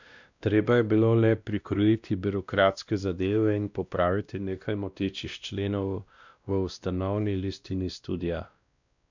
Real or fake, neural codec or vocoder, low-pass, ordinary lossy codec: fake; codec, 16 kHz, 1 kbps, X-Codec, WavLM features, trained on Multilingual LibriSpeech; 7.2 kHz; none